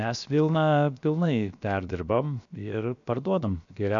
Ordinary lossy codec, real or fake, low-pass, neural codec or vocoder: MP3, 64 kbps; fake; 7.2 kHz; codec, 16 kHz, 0.7 kbps, FocalCodec